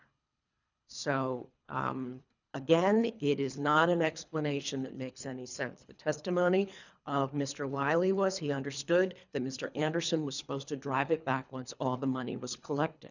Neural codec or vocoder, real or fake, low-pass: codec, 24 kHz, 3 kbps, HILCodec; fake; 7.2 kHz